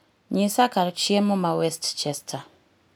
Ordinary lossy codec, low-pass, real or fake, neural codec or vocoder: none; none; real; none